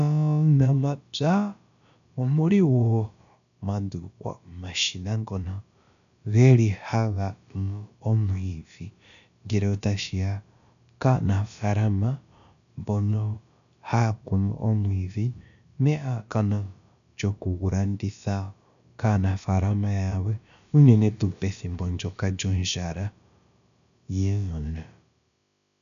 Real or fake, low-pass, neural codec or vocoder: fake; 7.2 kHz; codec, 16 kHz, about 1 kbps, DyCAST, with the encoder's durations